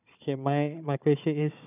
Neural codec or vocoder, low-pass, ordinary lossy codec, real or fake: codec, 16 kHz, 4 kbps, FunCodec, trained on Chinese and English, 50 frames a second; 3.6 kHz; none; fake